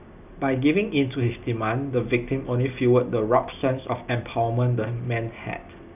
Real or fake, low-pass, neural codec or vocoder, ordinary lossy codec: real; 3.6 kHz; none; none